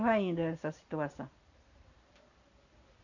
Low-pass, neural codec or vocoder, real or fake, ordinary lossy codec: 7.2 kHz; none; real; none